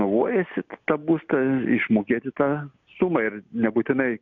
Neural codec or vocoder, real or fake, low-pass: none; real; 7.2 kHz